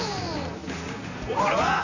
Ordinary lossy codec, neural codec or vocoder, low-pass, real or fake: none; vocoder, 44.1 kHz, 128 mel bands, Pupu-Vocoder; 7.2 kHz; fake